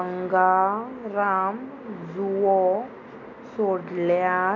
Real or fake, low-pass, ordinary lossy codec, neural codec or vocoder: real; 7.2 kHz; none; none